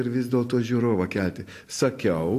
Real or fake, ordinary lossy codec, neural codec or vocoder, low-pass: real; AAC, 64 kbps; none; 14.4 kHz